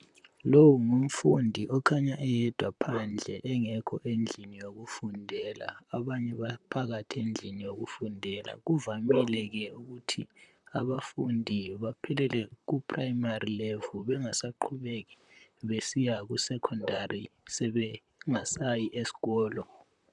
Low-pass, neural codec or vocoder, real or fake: 10.8 kHz; vocoder, 44.1 kHz, 128 mel bands, Pupu-Vocoder; fake